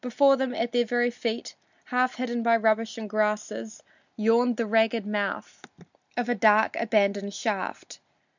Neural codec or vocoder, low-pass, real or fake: none; 7.2 kHz; real